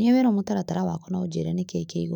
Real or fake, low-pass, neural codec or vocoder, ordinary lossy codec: fake; 19.8 kHz; autoencoder, 48 kHz, 128 numbers a frame, DAC-VAE, trained on Japanese speech; none